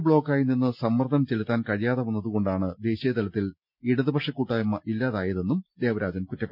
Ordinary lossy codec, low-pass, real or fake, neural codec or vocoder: none; 5.4 kHz; real; none